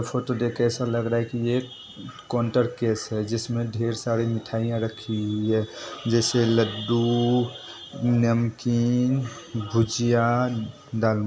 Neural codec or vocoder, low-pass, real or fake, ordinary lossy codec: none; none; real; none